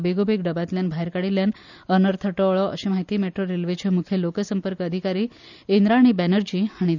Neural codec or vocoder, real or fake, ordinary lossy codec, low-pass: none; real; none; 7.2 kHz